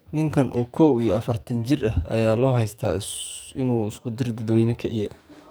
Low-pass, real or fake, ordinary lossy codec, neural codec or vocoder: none; fake; none; codec, 44.1 kHz, 2.6 kbps, SNAC